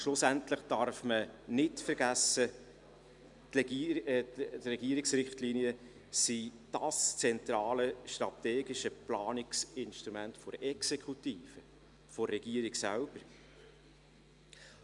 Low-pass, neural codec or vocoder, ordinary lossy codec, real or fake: 10.8 kHz; none; MP3, 96 kbps; real